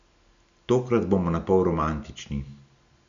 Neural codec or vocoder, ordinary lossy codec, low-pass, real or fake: none; none; 7.2 kHz; real